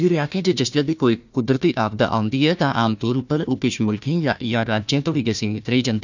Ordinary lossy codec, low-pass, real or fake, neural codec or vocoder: none; 7.2 kHz; fake; codec, 16 kHz, 1 kbps, FunCodec, trained on Chinese and English, 50 frames a second